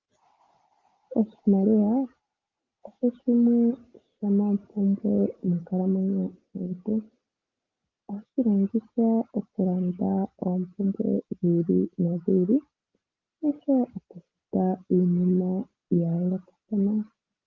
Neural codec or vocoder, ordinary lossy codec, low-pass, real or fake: none; Opus, 32 kbps; 7.2 kHz; real